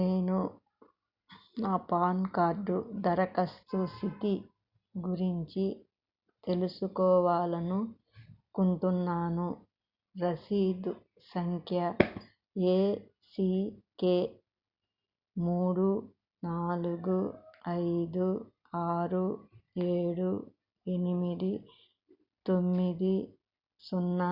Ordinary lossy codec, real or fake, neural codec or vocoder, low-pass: Opus, 64 kbps; real; none; 5.4 kHz